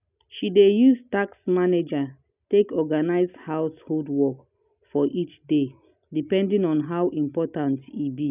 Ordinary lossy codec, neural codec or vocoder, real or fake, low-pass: none; none; real; 3.6 kHz